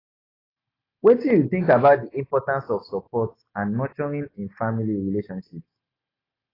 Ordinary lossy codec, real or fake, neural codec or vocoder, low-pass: AAC, 24 kbps; real; none; 5.4 kHz